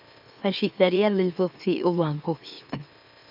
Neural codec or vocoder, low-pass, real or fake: autoencoder, 44.1 kHz, a latent of 192 numbers a frame, MeloTTS; 5.4 kHz; fake